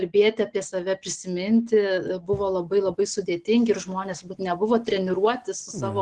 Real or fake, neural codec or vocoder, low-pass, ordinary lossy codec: real; none; 10.8 kHz; Opus, 16 kbps